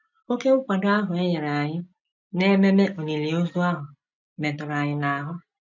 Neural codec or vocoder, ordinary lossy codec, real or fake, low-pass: none; none; real; 7.2 kHz